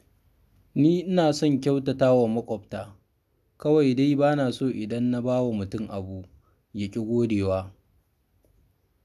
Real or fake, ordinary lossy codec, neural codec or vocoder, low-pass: real; none; none; 14.4 kHz